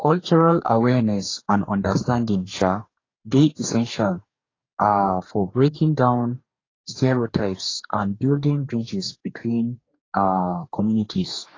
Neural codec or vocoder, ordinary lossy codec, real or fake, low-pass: codec, 44.1 kHz, 2.6 kbps, DAC; AAC, 32 kbps; fake; 7.2 kHz